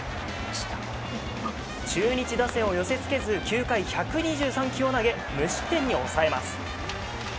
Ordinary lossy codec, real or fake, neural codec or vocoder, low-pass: none; real; none; none